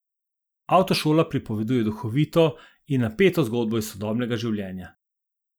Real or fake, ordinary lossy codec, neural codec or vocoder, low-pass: real; none; none; none